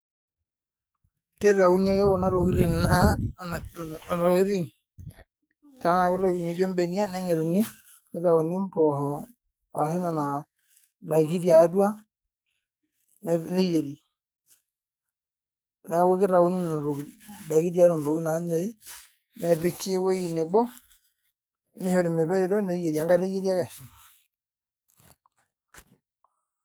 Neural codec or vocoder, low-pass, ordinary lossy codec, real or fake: codec, 44.1 kHz, 2.6 kbps, SNAC; none; none; fake